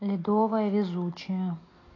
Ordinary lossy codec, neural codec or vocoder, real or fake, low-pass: AAC, 32 kbps; none; real; 7.2 kHz